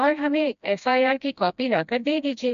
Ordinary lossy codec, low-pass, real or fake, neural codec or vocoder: none; 7.2 kHz; fake; codec, 16 kHz, 1 kbps, FreqCodec, smaller model